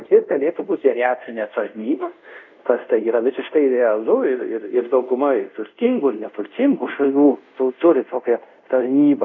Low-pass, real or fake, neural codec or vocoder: 7.2 kHz; fake; codec, 24 kHz, 0.5 kbps, DualCodec